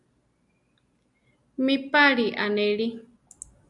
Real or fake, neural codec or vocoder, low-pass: real; none; 10.8 kHz